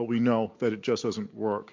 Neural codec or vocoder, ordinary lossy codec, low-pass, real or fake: none; MP3, 48 kbps; 7.2 kHz; real